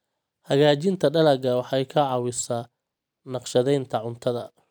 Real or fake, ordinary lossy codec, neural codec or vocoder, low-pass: real; none; none; none